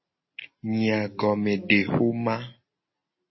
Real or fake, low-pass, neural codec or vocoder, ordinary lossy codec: real; 7.2 kHz; none; MP3, 24 kbps